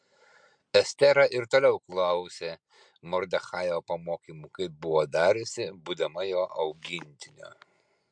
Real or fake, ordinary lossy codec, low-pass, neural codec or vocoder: real; MP3, 64 kbps; 9.9 kHz; none